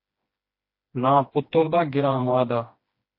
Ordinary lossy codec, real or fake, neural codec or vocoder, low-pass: MP3, 32 kbps; fake; codec, 16 kHz, 2 kbps, FreqCodec, smaller model; 5.4 kHz